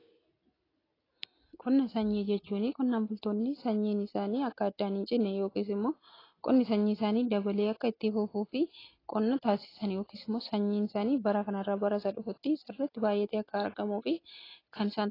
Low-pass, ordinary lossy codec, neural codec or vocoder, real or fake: 5.4 kHz; AAC, 24 kbps; none; real